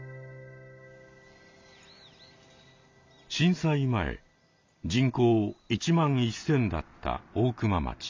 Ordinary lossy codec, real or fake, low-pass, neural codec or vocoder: MP3, 64 kbps; real; 7.2 kHz; none